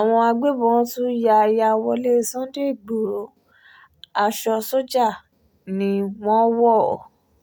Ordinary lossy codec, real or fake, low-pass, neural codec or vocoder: none; real; none; none